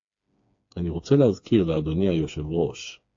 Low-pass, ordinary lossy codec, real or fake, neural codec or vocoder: 7.2 kHz; AAC, 48 kbps; fake; codec, 16 kHz, 4 kbps, FreqCodec, smaller model